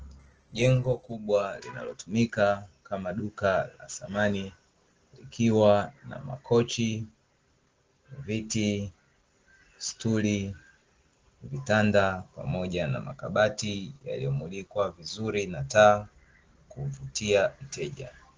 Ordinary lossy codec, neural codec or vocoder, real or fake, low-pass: Opus, 16 kbps; none; real; 7.2 kHz